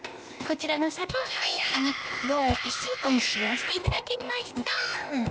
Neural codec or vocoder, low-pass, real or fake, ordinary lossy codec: codec, 16 kHz, 0.8 kbps, ZipCodec; none; fake; none